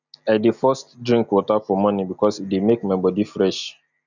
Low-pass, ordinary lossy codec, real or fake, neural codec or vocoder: 7.2 kHz; none; real; none